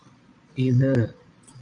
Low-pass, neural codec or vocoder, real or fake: 9.9 kHz; vocoder, 22.05 kHz, 80 mel bands, Vocos; fake